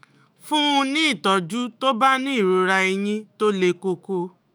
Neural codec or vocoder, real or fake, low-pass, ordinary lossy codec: autoencoder, 48 kHz, 128 numbers a frame, DAC-VAE, trained on Japanese speech; fake; none; none